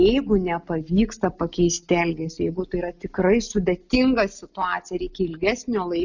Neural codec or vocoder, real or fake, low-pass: none; real; 7.2 kHz